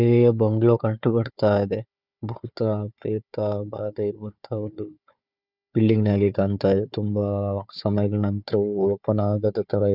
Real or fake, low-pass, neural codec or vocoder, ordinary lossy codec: fake; 5.4 kHz; codec, 16 kHz, 4 kbps, FunCodec, trained on Chinese and English, 50 frames a second; none